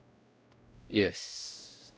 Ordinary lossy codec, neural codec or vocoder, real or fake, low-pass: none; codec, 16 kHz, 0.5 kbps, X-Codec, WavLM features, trained on Multilingual LibriSpeech; fake; none